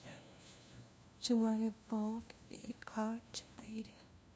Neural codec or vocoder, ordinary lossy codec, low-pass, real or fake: codec, 16 kHz, 1 kbps, FunCodec, trained on LibriTTS, 50 frames a second; none; none; fake